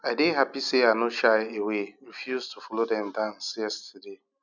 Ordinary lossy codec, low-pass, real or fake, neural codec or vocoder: none; 7.2 kHz; real; none